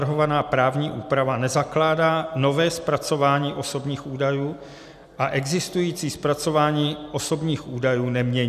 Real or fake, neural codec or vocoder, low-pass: real; none; 14.4 kHz